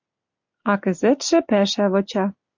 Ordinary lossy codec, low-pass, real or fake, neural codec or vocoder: MP3, 64 kbps; 7.2 kHz; real; none